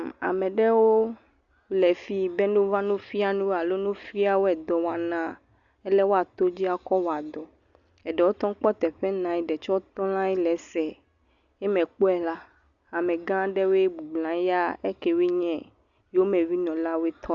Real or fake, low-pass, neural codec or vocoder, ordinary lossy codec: real; 7.2 kHz; none; Opus, 64 kbps